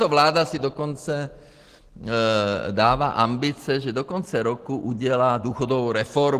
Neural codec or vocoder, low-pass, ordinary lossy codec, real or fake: none; 14.4 kHz; Opus, 16 kbps; real